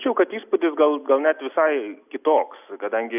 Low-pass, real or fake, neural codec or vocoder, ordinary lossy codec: 3.6 kHz; real; none; AAC, 32 kbps